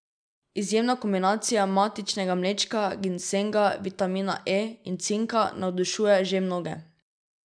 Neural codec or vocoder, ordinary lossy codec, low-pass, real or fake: none; none; 9.9 kHz; real